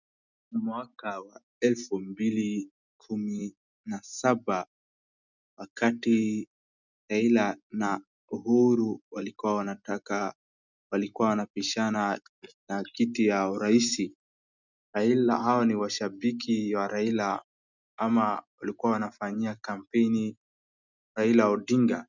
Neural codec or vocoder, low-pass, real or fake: none; 7.2 kHz; real